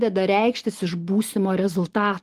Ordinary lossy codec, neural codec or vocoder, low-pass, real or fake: Opus, 24 kbps; none; 14.4 kHz; real